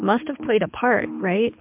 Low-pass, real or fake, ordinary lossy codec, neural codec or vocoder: 3.6 kHz; fake; MP3, 32 kbps; codec, 24 kHz, 6 kbps, HILCodec